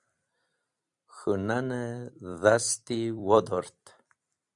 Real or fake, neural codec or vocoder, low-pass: fake; vocoder, 44.1 kHz, 128 mel bands every 256 samples, BigVGAN v2; 10.8 kHz